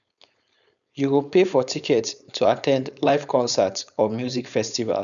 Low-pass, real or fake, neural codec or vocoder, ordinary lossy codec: 7.2 kHz; fake; codec, 16 kHz, 4.8 kbps, FACodec; none